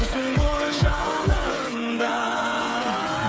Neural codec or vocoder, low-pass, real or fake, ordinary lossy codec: codec, 16 kHz, 8 kbps, FreqCodec, smaller model; none; fake; none